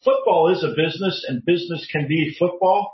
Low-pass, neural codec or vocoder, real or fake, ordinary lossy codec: 7.2 kHz; none; real; MP3, 24 kbps